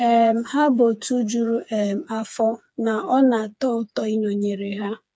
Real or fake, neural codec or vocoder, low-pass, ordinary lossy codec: fake; codec, 16 kHz, 4 kbps, FreqCodec, smaller model; none; none